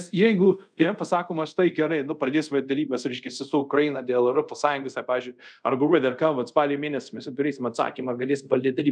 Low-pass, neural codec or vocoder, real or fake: 9.9 kHz; codec, 24 kHz, 0.5 kbps, DualCodec; fake